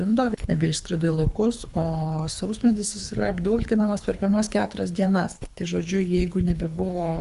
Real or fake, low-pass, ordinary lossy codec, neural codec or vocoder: fake; 10.8 kHz; Opus, 64 kbps; codec, 24 kHz, 3 kbps, HILCodec